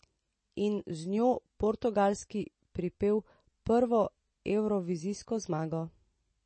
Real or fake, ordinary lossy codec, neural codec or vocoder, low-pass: real; MP3, 32 kbps; none; 9.9 kHz